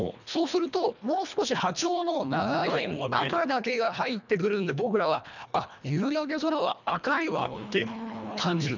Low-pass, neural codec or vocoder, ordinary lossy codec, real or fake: 7.2 kHz; codec, 24 kHz, 1.5 kbps, HILCodec; none; fake